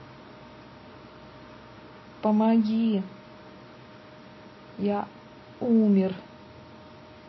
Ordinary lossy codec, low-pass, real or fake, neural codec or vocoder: MP3, 24 kbps; 7.2 kHz; real; none